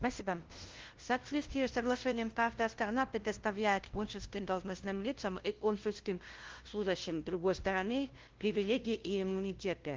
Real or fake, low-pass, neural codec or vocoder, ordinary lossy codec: fake; 7.2 kHz; codec, 16 kHz, 0.5 kbps, FunCodec, trained on LibriTTS, 25 frames a second; Opus, 16 kbps